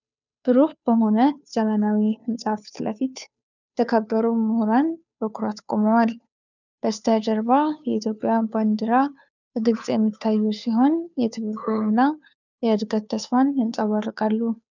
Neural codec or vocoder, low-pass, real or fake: codec, 16 kHz, 2 kbps, FunCodec, trained on Chinese and English, 25 frames a second; 7.2 kHz; fake